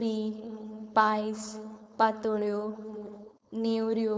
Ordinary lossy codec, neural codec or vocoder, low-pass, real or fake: none; codec, 16 kHz, 4.8 kbps, FACodec; none; fake